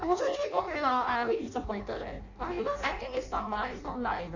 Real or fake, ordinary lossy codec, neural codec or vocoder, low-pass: fake; none; codec, 16 kHz in and 24 kHz out, 0.6 kbps, FireRedTTS-2 codec; 7.2 kHz